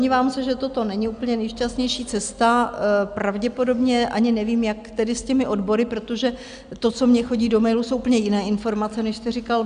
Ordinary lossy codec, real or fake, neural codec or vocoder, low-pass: Opus, 64 kbps; real; none; 9.9 kHz